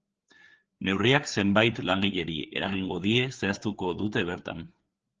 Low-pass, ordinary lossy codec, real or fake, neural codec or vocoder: 7.2 kHz; Opus, 16 kbps; fake; codec, 16 kHz, 4 kbps, FreqCodec, larger model